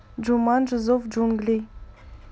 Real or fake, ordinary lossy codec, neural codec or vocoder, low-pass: real; none; none; none